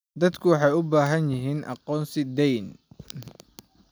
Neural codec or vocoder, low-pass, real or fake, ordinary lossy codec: none; none; real; none